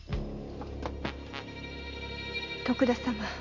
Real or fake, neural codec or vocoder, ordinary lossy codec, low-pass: real; none; none; 7.2 kHz